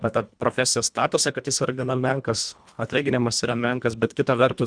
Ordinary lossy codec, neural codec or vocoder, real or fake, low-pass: Opus, 64 kbps; codec, 24 kHz, 1.5 kbps, HILCodec; fake; 9.9 kHz